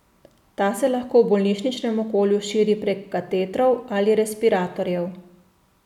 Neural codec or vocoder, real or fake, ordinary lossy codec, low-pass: none; real; none; 19.8 kHz